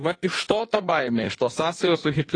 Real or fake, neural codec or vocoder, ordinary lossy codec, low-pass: fake; codec, 16 kHz in and 24 kHz out, 1.1 kbps, FireRedTTS-2 codec; AAC, 32 kbps; 9.9 kHz